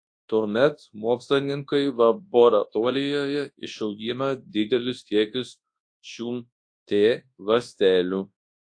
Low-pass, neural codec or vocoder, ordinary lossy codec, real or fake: 9.9 kHz; codec, 24 kHz, 0.9 kbps, WavTokenizer, large speech release; AAC, 48 kbps; fake